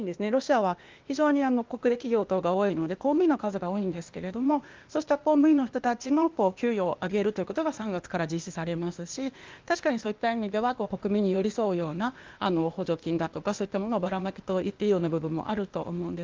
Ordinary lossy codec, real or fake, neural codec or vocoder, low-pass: Opus, 32 kbps; fake; codec, 16 kHz, 0.8 kbps, ZipCodec; 7.2 kHz